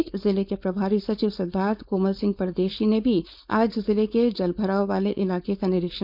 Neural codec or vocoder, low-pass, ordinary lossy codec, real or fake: codec, 16 kHz, 4.8 kbps, FACodec; 5.4 kHz; none; fake